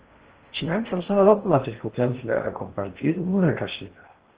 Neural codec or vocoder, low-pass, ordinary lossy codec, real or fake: codec, 16 kHz in and 24 kHz out, 0.6 kbps, FocalCodec, streaming, 4096 codes; 3.6 kHz; Opus, 16 kbps; fake